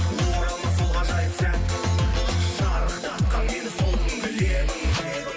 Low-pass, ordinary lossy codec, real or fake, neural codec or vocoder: none; none; real; none